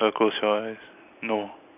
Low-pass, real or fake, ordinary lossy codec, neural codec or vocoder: 3.6 kHz; real; none; none